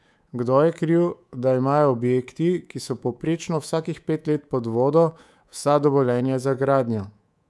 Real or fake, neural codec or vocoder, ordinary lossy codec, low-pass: fake; codec, 24 kHz, 3.1 kbps, DualCodec; none; none